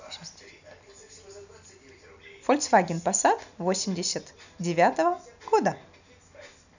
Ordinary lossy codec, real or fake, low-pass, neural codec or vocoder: none; real; 7.2 kHz; none